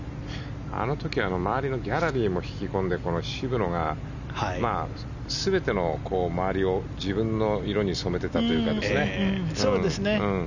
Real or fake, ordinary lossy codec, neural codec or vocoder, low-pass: real; none; none; 7.2 kHz